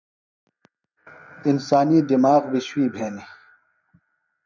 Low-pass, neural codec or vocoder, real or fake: 7.2 kHz; vocoder, 44.1 kHz, 128 mel bands every 512 samples, BigVGAN v2; fake